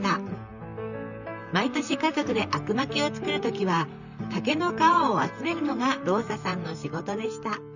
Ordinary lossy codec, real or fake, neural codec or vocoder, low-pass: none; fake; vocoder, 44.1 kHz, 128 mel bands, Pupu-Vocoder; 7.2 kHz